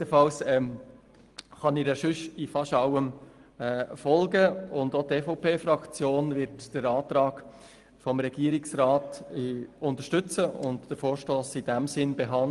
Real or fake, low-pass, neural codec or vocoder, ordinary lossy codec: real; 10.8 kHz; none; Opus, 24 kbps